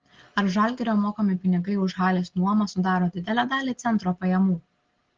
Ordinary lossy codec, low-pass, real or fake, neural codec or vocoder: Opus, 16 kbps; 7.2 kHz; real; none